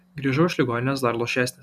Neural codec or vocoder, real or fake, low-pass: none; real; 14.4 kHz